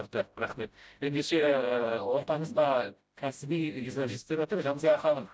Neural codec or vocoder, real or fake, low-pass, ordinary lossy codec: codec, 16 kHz, 0.5 kbps, FreqCodec, smaller model; fake; none; none